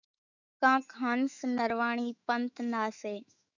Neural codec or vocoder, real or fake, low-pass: autoencoder, 48 kHz, 128 numbers a frame, DAC-VAE, trained on Japanese speech; fake; 7.2 kHz